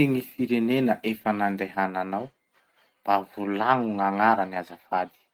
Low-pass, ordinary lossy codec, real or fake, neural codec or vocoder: 19.8 kHz; Opus, 24 kbps; real; none